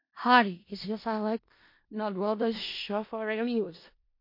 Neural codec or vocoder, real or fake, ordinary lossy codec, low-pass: codec, 16 kHz in and 24 kHz out, 0.4 kbps, LongCat-Audio-Codec, four codebook decoder; fake; MP3, 32 kbps; 5.4 kHz